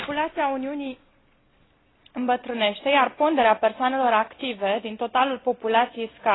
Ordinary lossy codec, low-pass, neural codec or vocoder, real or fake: AAC, 16 kbps; 7.2 kHz; none; real